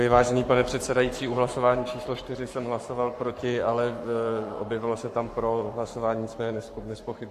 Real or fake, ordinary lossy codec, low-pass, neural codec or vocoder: fake; AAC, 64 kbps; 14.4 kHz; codec, 44.1 kHz, 7.8 kbps, Pupu-Codec